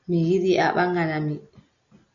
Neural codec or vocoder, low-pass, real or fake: none; 7.2 kHz; real